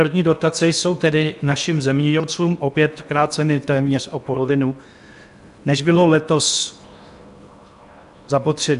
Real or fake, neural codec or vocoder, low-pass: fake; codec, 16 kHz in and 24 kHz out, 0.8 kbps, FocalCodec, streaming, 65536 codes; 10.8 kHz